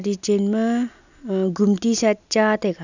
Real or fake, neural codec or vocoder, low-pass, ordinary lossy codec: real; none; 7.2 kHz; none